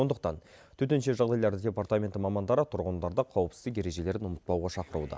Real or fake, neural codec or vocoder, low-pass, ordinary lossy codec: real; none; none; none